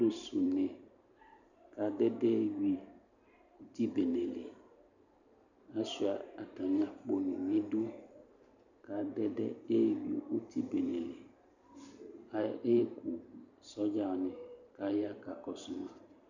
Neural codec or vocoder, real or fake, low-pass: vocoder, 44.1 kHz, 128 mel bands every 512 samples, BigVGAN v2; fake; 7.2 kHz